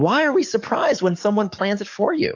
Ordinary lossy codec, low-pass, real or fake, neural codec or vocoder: AAC, 48 kbps; 7.2 kHz; fake; codec, 44.1 kHz, 7.8 kbps, DAC